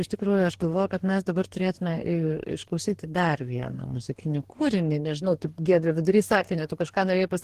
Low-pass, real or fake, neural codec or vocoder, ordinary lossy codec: 14.4 kHz; fake; codec, 44.1 kHz, 2.6 kbps, DAC; Opus, 16 kbps